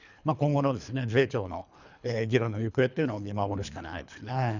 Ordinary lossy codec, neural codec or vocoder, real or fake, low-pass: none; codec, 24 kHz, 3 kbps, HILCodec; fake; 7.2 kHz